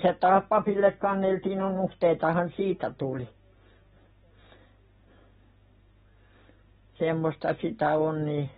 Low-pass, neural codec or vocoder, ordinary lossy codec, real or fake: 10.8 kHz; none; AAC, 16 kbps; real